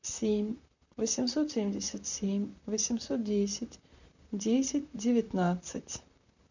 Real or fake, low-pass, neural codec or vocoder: fake; 7.2 kHz; vocoder, 44.1 kHz, 128 mel bands, Pupu-Vocoder